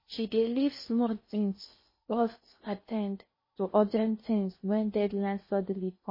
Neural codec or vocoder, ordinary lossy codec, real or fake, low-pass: codec, 16 kHz in and 24 kHz out, 0.6 kbps, FocalCodec, streaming, 2048 codes; MP3, 24 kbps; fake; 5.4 kHz